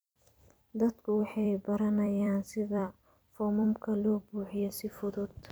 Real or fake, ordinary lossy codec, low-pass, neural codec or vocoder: fake; none; none; vocoder, 44.1 kHz, 128 mel bands every 256 samples, BigVGAN v2